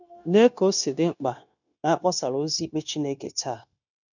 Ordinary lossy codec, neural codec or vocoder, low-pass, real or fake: none; codec, 16 kHz, 0.9 kbps, LongCat-Audio-Codec; 7.2 kHz; fake